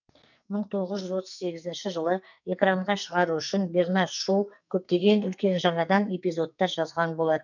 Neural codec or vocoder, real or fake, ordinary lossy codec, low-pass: codec, 44.1 kHz, 2.6 kbps, SNAC; fake; none; 7.2 kHz